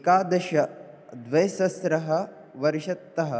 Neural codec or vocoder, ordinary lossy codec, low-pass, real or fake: none; none; none; real